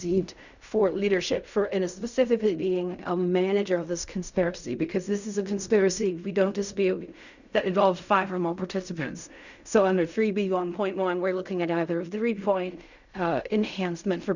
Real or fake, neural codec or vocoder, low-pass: fake; codec, 16 kHz in and 24 kHz out, 0.4 kbps, LongCat-Audio-Codec, fine tuned four codebook decoder; 7.2 kHz